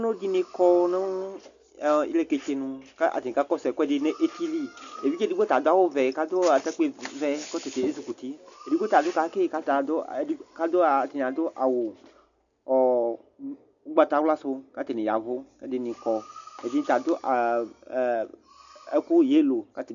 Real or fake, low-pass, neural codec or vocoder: real; 7.2 kHz; none